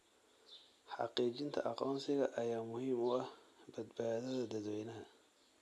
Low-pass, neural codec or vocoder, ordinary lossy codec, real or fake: none; none; none; real